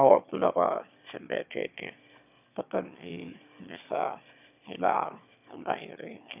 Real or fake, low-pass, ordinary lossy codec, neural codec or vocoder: fake; 3.6 kHz; none; autoencoder, 22.05 kHz, a latent of 192 numbers a frame, VITS, trained on one speaker